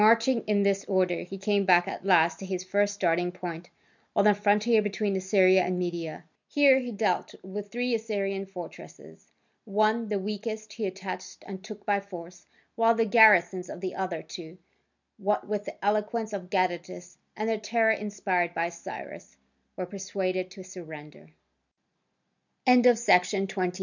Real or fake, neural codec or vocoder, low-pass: real; none; 7.2 kHz